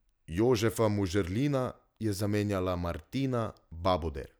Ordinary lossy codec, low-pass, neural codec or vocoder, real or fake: none; none; none; real